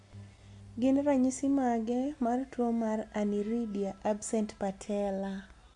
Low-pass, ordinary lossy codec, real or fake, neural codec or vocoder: 10.8 kHz; MP3, 64 kbps; real; none